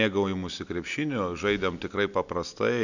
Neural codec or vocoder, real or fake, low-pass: none; real; 7.2 kHz